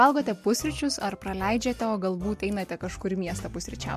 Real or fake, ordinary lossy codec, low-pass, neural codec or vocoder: real; MP3, 64 kbps; 14.4 kHz; none